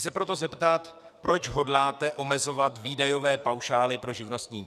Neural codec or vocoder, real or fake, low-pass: codec, 44.1 kHz, 2.6 kbps, SNAC; fake; 14.4 kHz